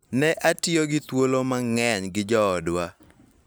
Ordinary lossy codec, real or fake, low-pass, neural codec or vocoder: none; real; none; none